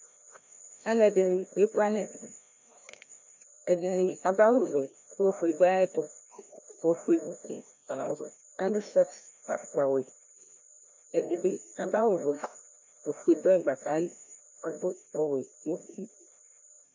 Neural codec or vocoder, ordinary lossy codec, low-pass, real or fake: codec, 16 kHz, 1 kbps, FreqCodec, larger model; MP3, 64 kbps; 7.2 kHz; fake